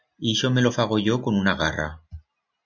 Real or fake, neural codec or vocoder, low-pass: real; none; 7.2 kHz